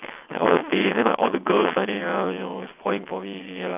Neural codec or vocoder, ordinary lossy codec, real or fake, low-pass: vocoder, 22.05 kHz, 80 mel bands, WaveNeXt; none; fake; 3.6 kHz